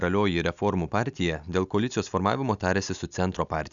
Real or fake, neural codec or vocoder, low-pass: real; none; 7.2 kHz